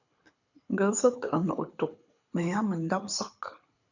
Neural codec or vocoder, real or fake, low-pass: codec, 24 kHz, 6 kbps, HILCodec; fake; 7.2 kHz